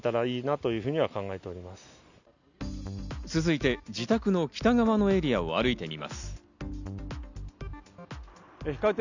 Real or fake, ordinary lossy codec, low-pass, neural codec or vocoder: real; none; 7.2 kHz; none